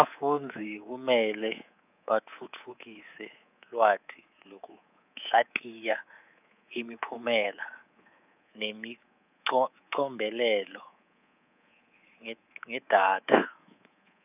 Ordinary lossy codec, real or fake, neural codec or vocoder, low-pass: none; fake; codec, 24 kHz, 3.1 kbps, DualCodec; 3.6 kHz